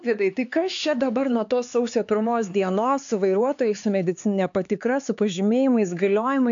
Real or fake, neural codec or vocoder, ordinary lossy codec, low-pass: fake; codec, 16 kHz, 4 kbps, X-Codec, HuBERT features, trained on LibriSpeech; AAC, 64 kbps; 7.2 kHz